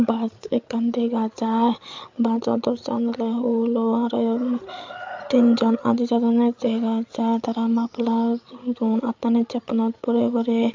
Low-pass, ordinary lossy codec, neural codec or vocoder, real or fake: 7.2 kHz; MP3, 64 kbps; codec, 16 kHz, 8 kbps, FreqCodec, larger model; fake